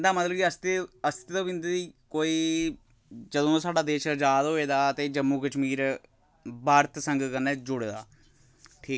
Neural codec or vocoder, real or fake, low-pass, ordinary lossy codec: none; real; none; none